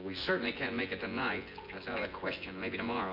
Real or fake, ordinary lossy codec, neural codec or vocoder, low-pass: fake; MP3, 32 kbps; vocoder, 24 kHz, 100 mel bands, Vocos; 5.4 kHz